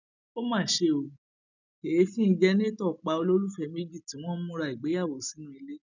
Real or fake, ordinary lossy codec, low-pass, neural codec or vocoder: real; none; 7.2 kHz; none